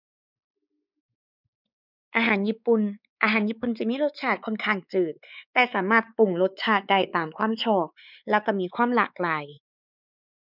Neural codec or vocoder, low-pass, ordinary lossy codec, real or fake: codec, 16 kHz, 4 kbps, X-Codec, WavLM features, trained on Multilingual LibriSpeech; 5.4 kHz; none; fake